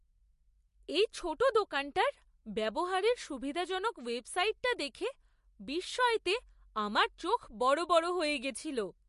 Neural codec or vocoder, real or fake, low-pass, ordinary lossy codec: none; real; 14.4 kHz; MP3, 48 kbps